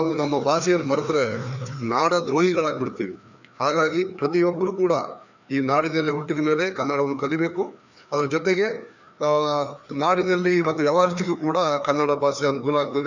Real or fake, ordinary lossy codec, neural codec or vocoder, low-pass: fake; none; codec, 16 kHz, 2 kbps, FreqCodec, larger model; 7.2 kHz